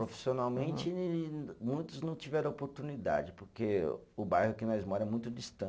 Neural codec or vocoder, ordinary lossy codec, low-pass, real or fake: none; none; none; real